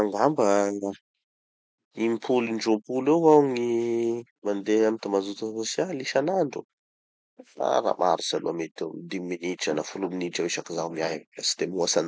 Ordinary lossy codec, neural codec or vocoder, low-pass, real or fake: none; none; none; real